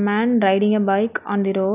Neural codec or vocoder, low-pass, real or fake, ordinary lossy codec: none; 3.6 kHz; real; none